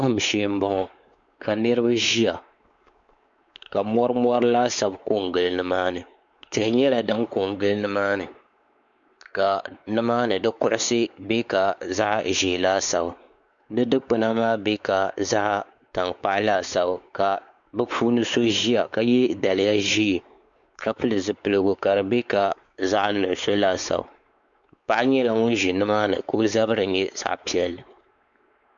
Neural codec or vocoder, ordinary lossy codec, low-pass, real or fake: codec, 16 kHz, 4 kbps, X-Codec, WavLM features, trained on Multilingual LibriSpeech; Opus, 64 kbps; 7.2 kHz; fake